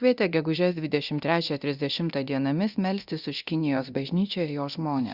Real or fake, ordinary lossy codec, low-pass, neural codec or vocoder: fake; Opus, 64 kbps; 5.4 kHz; codec, 24 kHz, 0.9 kbps, DualCodec